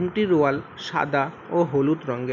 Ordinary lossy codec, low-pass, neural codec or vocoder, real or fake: none; 7.2 kHz; none; real